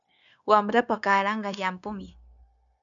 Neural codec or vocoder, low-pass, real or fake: codec, 16 kHz, 0.9 kbps, LongCat-Audio-Codec; 7.2 kHz; fake